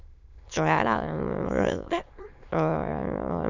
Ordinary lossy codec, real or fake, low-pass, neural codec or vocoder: none; fake; 7.2 kHz; autoencoder, 22.05 kHz, a latent of 192 numbers a frame, VITS, trained on many speakers